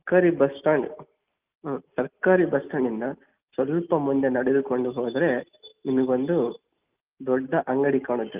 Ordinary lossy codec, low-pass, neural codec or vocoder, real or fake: Opus, 32 kbps; 3.6 kHz; none; real